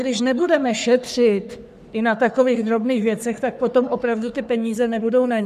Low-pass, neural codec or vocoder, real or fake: 14.4 kHz; codec, 44.1 kHz, 3.4 kbps, Pupu-Codec; fake